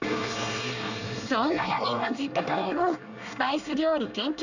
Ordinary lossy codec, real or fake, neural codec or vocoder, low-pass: none; fake; codec, 24 kHz, 1 kbps, SNAC; 7.2 kHz